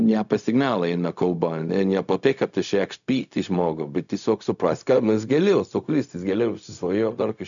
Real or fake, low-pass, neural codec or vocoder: fake; 7.2 kHz; codec, 16 kHz, 0.4 kbps, LongCat-Audio-Codec